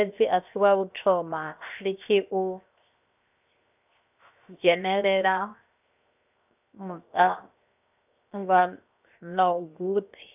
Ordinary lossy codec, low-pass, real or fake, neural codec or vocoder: none; 3.6 kHz; fake; codec, 16 kHz, 0.7 kbps, FocalCodec